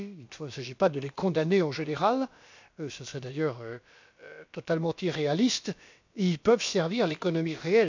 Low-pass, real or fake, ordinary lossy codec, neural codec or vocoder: 7.2 kHz; fake; MP3, 64 kbps; codec, 16 kHz, about 1 kbps, DyCAST, with the encoder's durations